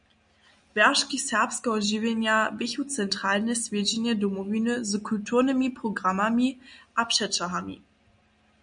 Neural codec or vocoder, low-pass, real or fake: none; 9.9 kHz; real